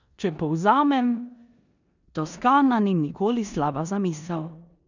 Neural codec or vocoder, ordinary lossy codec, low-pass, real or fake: codec, 16 kHz in and 24 kHz out, 0.9 kbps, LongCat-Audio-Codec, four codebook decoder; none; 7.2 kHz; fake